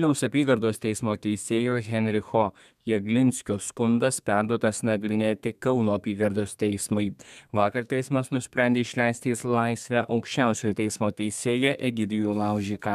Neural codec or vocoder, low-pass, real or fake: codec, 32 kHz, 1.9 kbps, SNAC; 14.4 kHz; fake